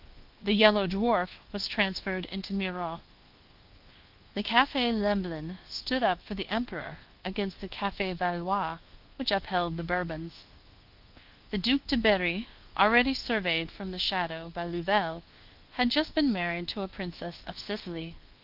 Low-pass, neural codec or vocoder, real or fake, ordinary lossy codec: 5.4 kHz; codec, 24 kHz, 1.2 kbps, DualCodec; fake; Opus, 16 kbps